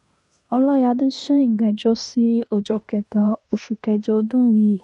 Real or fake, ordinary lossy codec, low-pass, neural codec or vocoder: fake; none; 10.8 kHz; codec, 16 kHz in and 24 kHz out, 0.9 kbps, LongCat-Audio-Codec, fine tuned four codebook decoder